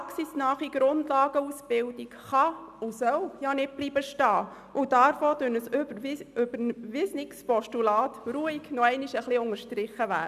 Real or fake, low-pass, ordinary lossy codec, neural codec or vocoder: real; 14.4 kHz; none; none